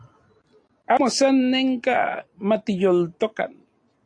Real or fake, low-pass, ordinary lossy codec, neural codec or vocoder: real; 9.9 kHz; AAC, 48 kbps; none